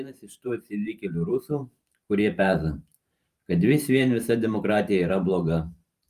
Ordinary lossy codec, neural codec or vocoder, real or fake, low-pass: Opus, 32 kbps; vocoder, 44.1 kHz, 128 mel bands every 512 samples, BigVGAN v2; fake; 14.4 kHz